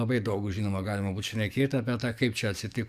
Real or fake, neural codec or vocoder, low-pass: fake; codec, 44.1 kHz, 7.8 kbps, DAC; 14.4 kHz